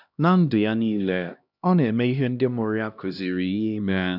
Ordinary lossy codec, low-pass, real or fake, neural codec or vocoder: none; 5.4 kHz; fake; codec, 16 kHz, 1 kbps, X-Codec, HuBERT features, trained on LibriSpeech